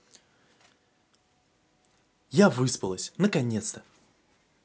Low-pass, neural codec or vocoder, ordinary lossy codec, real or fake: none; none; none; real